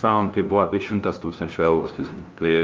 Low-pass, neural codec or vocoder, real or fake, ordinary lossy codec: 7.2 kHz; codec, 16 kHz, 0.5 kbps, FunCodec, trained on LibriTTS, 25 frames a second; fake; Opus, 24 kbps